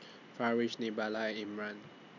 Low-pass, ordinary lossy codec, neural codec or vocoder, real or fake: 7.2 kHz; none; none; real